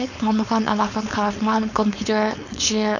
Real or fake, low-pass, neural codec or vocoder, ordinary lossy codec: fake; 7.2 kHz; codec, 16 kHz, 4.8 kbps, FACodec; none